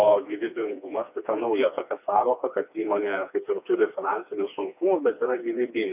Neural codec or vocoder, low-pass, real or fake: codec, 16 kHz, 2 kbps, FreqCodec, smaller model; 3.6 kHz; fake